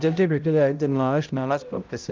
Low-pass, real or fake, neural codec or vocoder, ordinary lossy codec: 7.2 kHz; fake; codec, 16 kHz, 0.5 kbps, X-Codec, HuBERT features, trained on balanced general audio; Opus, 24 kbps